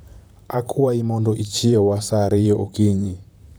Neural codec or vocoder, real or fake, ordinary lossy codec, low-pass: vocoder, 44.1 kHz, 128 mel bands, Pupu-Vocoder; fake; none; none